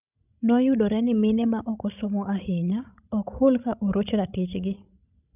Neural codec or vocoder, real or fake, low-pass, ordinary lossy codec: codec, 16 kHz, 16 kbps, FreqCodec, larger model; fake; 3.6 kHz; none